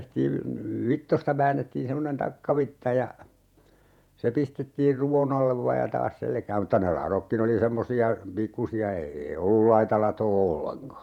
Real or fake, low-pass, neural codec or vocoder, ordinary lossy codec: fake; 19.8 kHz; vocoder, 48 kHz, 128 mel bands, Vocos; none